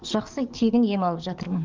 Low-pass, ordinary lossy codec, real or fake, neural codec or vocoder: 7.2 kHz; Opus, 24 kbps; fake; codec, 44.1 kHz, 7.8 kbps, DAC